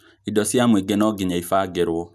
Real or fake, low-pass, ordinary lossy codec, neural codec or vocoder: real; 14.4 kHz; none; none